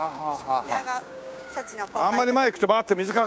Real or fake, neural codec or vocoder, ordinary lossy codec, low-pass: fake; codec, 16 kHz, 6 kbps, DAC; none; none